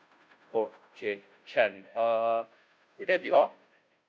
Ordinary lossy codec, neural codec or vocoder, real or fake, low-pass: none; codec, 16 kHz, 0.5 kbps, FunCodec, trained on Chinese and English, 25 frames a second; fake; none